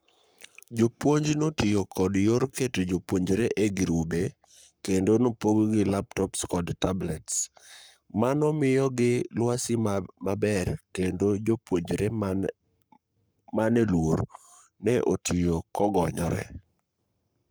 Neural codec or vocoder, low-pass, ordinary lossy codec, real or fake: codec, 44.1 kHz, 7.8 kbps, Pupu-Codec; none; none; fake